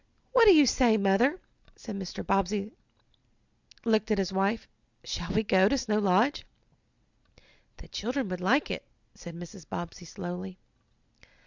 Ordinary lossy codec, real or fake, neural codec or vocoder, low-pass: Opus, 64 kbps; real; none; 7.2 kHz